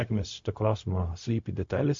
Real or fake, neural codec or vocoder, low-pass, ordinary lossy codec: fake; codec, 16 kHz, 0.4 kbps, LongCat-Audio-Codec; 7.2 kHz; MP3, 48 kbps